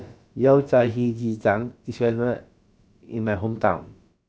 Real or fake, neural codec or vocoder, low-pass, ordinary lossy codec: fake; codec, 16 kHz, about 1 kbps, DyCAST, with the encoder's durations; none; none